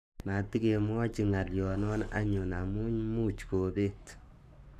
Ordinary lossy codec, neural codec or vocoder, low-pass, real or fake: none; codec, 44.1 kHz, 7.8 kbps, Pupu-Codec; 14.4 kHz; fake